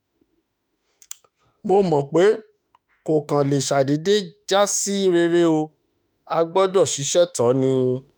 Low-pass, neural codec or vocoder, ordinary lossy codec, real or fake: none; autoencoder, 48 kHz, 32 numbers a frame, DAC-VAE, trained on Japanese speech; none; fake